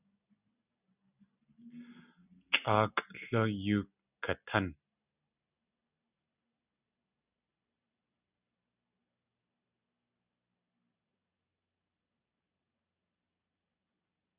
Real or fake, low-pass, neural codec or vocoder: real; 3.6 kHz; none